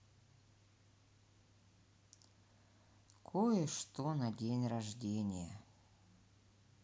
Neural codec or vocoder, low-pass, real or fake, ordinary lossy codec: none; none; real; none